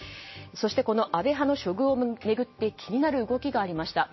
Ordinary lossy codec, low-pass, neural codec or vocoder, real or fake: MP3, 24 kbps; 7.2 kHz; none; real